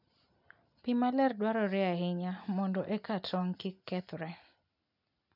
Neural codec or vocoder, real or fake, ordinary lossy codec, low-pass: none; real; none; 5.4 kHz